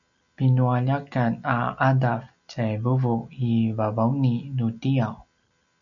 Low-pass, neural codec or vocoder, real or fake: 7.2 kHz; none; real